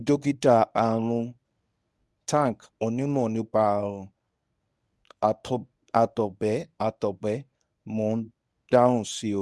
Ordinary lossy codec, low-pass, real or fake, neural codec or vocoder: none; none; fake; codec, 24 kHz, 0.9 kbps, WavTokenizer, medium speech release version 1